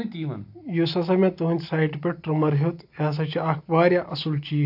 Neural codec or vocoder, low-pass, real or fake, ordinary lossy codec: none; 5.4 kHz; real; AAC, 48 kbps